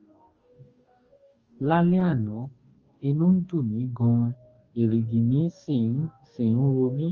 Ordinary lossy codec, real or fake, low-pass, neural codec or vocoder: Opus, 24 kbps; fake; 7.2 kHz; codec, 44.1 kHz, 2.6 kbps, DAC